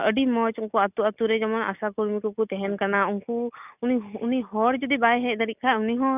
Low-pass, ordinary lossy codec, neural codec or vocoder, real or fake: 3.6 kHz; none; none; real